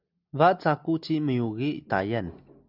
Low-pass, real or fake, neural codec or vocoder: 5.4 kHz; real; none